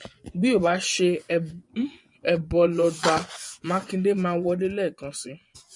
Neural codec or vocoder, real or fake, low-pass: vocoder, 24 kHz, 100 mel bands, Vocos; fake; 10.8 kHz